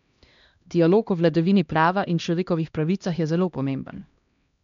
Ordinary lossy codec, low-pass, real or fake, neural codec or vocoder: MP3, 64 kbps; 7.2 kHz; fake; codec, 16 kHz, 1 kbps, X-Codec, HuBERT features, trained on LibriSpeech